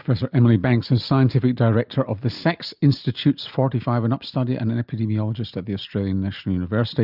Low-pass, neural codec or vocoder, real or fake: 5.4 kHz; none; real